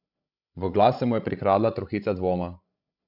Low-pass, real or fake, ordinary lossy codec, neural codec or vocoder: 5.4 kHz; fake; none; codec, 16 kHz, 16 kbps, FreqCodec, larger model